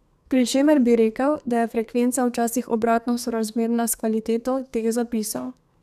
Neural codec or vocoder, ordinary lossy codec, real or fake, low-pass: codec, 32 kHz, 1.9 kbps, SNAC; none; fake; 14.4 kHz